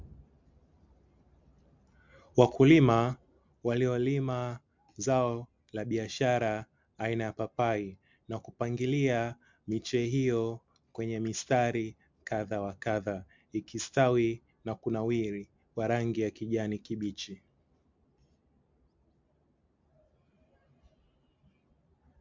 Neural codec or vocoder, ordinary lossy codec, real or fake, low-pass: none; MP3, 64 kbps; real; 7.2 kHz